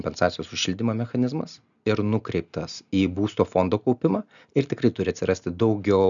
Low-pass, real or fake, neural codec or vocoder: 7.2 kHz; real; none